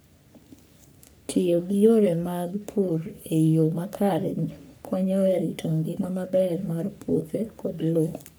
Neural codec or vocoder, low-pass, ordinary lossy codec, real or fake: codec, 44.1 kHz, 3.4 kbps, Pupu-Codec; none; none; fake